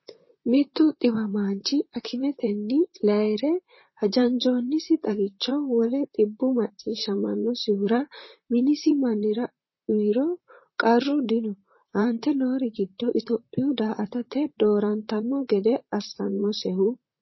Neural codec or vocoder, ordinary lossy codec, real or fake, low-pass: vocoder, 44.1 kHz, 128 mel bands, Pupu-Vocoder; MP3, 24 kbps; fake; 7.2 kHz